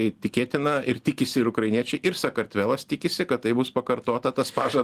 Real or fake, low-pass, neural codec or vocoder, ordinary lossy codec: real; 14.4 kHz; none; Opus, 24 kbps